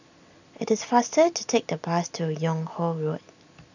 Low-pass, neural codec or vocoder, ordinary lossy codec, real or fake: 7.2 kHz; none; none; real